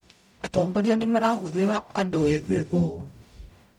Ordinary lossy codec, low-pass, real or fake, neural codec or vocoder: MP3, 96 kbps; 19.8 kHz; fake; codec, 44.1 kHz, 0.9 kbps, DAC